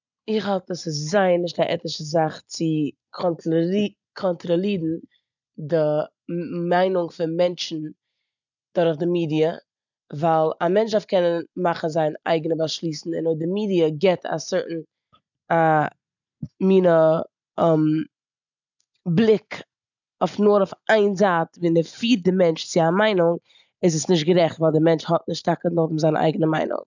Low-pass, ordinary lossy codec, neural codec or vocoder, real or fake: 7.2 kHz; none; none; real